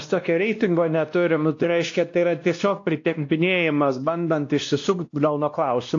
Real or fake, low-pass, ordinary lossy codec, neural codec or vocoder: fake; 7.2 kHz; AAC, 48 kbps; codec, 16 kHz, 1 kbps, X-Codec, WavLM features, trained on Multilingual LibriSpeech